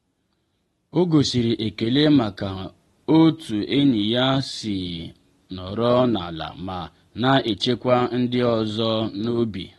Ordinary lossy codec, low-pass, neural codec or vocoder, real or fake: AAC, 32 kbps; 19.8 kHz; none; real